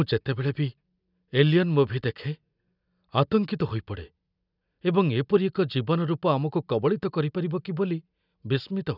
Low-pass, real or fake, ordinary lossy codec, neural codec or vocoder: 5.4 kHz; real; none; none